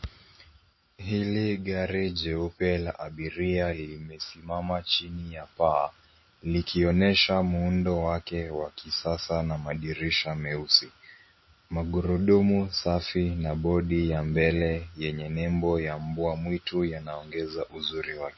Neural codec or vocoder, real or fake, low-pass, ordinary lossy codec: none; real; 7.2 kHz; MP3, 24 kbps